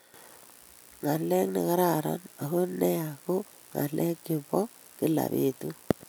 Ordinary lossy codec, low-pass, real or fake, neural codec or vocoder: none; none; real; none